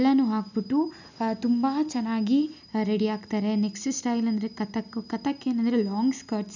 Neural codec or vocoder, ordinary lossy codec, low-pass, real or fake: none; none; 7.2 kHz; real